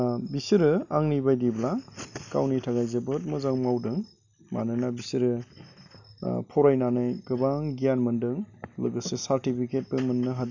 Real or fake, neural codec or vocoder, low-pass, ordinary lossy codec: real; none; 7.2 kHz; none